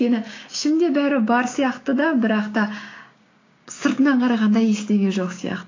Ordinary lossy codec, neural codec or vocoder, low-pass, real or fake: AAC, 32 kbps; codec, 16 kHz, 6 kbps, DAC; 7.2 kHz; fake